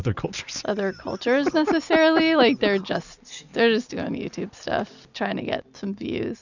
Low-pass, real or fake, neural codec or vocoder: 7.2 kHz; real; none